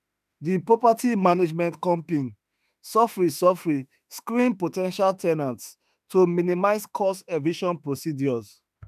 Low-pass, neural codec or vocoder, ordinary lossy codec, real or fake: 14.4 kHz; autoencoder, 48 kHz, 32 numbers a frame, DAC-VAE, trained on Japanese speech; none; fake